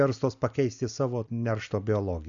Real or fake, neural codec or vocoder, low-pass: real; none; 7.2 kHz